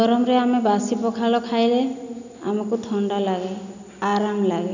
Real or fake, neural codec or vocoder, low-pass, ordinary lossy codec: real; none; 7.2 kHz; none